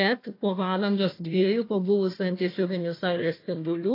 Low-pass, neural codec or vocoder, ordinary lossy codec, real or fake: 5.4 kHz; codec, 16 kHz, 1 kbps, FunCodec, trained on Chinese and English, 50 frames a second; AAC, 24 kbps; fake